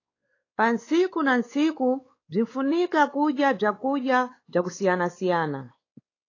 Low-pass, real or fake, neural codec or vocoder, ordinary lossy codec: 7.2 kHz; fake; codec, 16 kHz, 4 kbps, X-Codec, WavLM features, trained on Multilingual LibriSpeech; AAC, 32 kbps